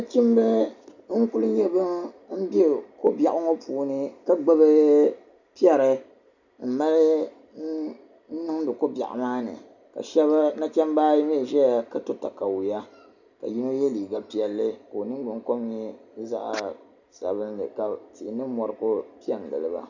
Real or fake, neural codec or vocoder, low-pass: real; none; 7.2 kHz